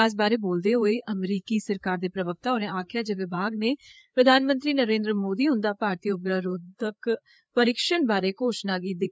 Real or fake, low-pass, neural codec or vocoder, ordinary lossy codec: fake; none; codec, 16 kHz, 4 kbps, FreqCodec, larger model; none